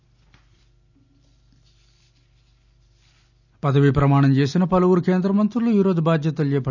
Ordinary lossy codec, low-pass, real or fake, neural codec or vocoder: none; 7.2 kHz; real; none